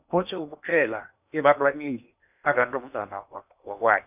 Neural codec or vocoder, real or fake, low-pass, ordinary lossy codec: codec, 16 kHz in and 24 kHz out, 0.8 kbps, FocalCodec, streaming, 65536 codes; fake; 3.6 kHz; none